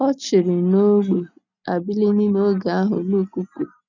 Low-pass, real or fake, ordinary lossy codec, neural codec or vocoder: 7.2 kHz; real; none; none